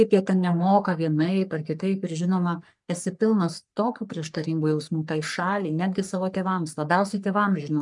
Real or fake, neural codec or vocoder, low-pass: fake; codec, 44.1 kHz, 3.4 kbps, Pupu-Codec; 10.8 kHz